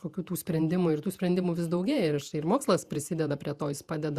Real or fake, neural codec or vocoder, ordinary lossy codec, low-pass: fake; vocoder, 48 kHz, 128 mel bands, Vocos; Opus, 64 kbps; 14.4 kHz